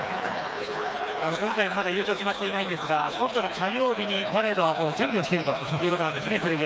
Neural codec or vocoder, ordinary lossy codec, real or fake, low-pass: codec, 16 kHz, 2 kbps, FreqCodec, smaller model; none; fake; none